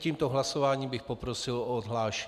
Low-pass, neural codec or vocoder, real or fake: 14.4 kHz; none; real